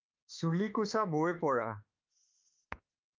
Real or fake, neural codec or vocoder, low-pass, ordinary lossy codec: fake; autoencoder, 48 kHz, 32 numbers a frame, DAC-VAE, trained on Japanese speech; 7.2 kHz; Opus, 32 kbps